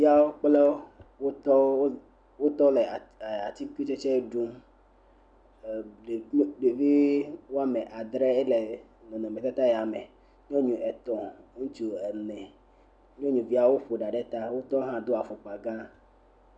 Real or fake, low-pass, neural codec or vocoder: real; 9.9 kHz; none